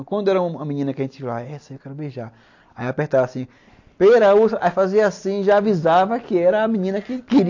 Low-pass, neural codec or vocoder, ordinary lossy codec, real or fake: 7.2 kHz; none; AAC, 48 kbps; real